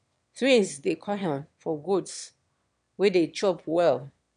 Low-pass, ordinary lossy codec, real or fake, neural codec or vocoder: 9.9 kHz; none; fake; autoencoder, 22.05 kHz, a latent of 192 numbers a frame, VITS, trained on one speaker